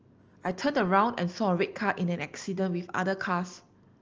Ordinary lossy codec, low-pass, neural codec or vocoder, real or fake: Opus, 24 kbps; 7.2 kHz; none; real